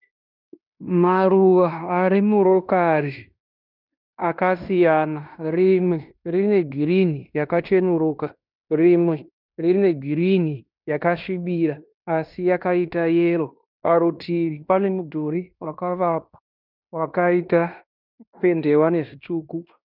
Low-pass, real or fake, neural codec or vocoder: 5.4 kHz; fake; codec, 16 kHz in and 24 kHz out, 0.9 kbps, LongCat-Audio-Codec, fine tuned four codebook decoder